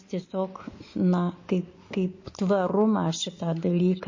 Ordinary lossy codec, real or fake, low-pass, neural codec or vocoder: MP3, 32 kbps; real; 7.2 kHz; none